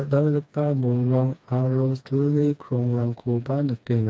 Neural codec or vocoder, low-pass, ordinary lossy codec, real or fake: codec, 16 kHz, 2 kbps, FreqCodec, smaller model; none; none; fake